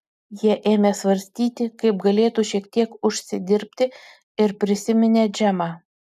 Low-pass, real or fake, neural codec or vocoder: 14.4 kHz; real; none